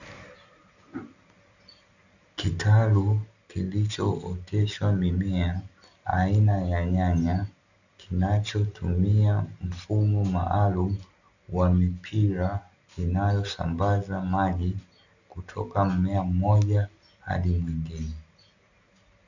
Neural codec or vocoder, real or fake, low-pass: none; real; 7.2 kHz